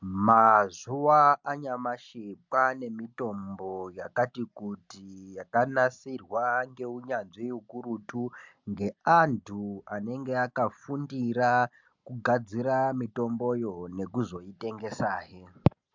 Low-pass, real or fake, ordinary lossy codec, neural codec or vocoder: 7.2 kHz; real; MP3, 64 kbps; none